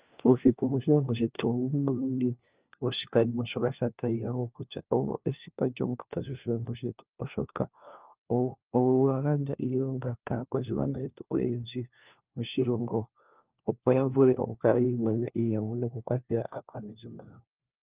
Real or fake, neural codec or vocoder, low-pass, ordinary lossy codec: fake; codec, 16 kHz, 1 kbps, FunCodec, trained on LibriTTS, 50 frames a second; 3.6 kHz; Opus, 24 kbps